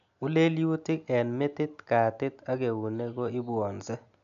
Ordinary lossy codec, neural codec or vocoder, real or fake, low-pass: none; none; real; 7.2 kHz